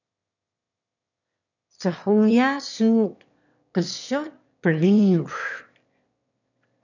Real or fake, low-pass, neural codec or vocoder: fake; 7.2 kHz; autoencoder, 22.05 kHz, a latent of 192 numbers a frame, VITS, trained on one speaker